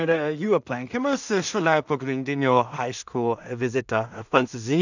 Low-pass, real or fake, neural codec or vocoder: 7.2 kHz; fake; codec, 16 kHz in and 24 kHz out, 0.4 kbps, LongCat-Audio-Codec, two codebook decoder